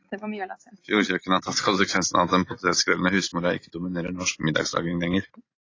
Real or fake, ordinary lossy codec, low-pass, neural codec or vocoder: real; AAC, 32 kbps; 7.2 kHz; none